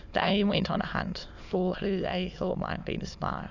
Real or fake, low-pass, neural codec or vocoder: fake; 7.2 kHz; autoencoder, 22.05 kHz, a latent of 192 numbers a frame, VITS, trained on many speakers